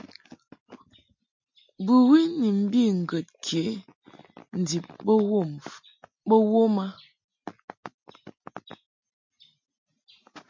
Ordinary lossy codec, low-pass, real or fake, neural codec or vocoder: MP3, 48 kbps; 7.2 kHz; real; none